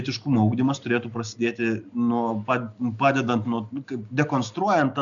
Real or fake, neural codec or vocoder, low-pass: real; none; 7.2 kHz